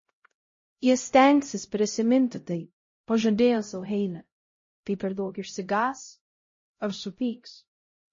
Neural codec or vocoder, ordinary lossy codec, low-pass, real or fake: codec, 16 kHz, 0.5 kbps, X-Codec, HuBERT features, trained on LibriSpeech; MP3, 32 kbps; 7.2 kHz; fake